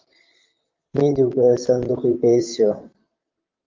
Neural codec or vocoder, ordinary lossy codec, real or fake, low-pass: vocoder, 44.1 kHz, 128 mel bands, Pupu-Vocoder; Opus, 32 kbps; fake; 7.2 kHz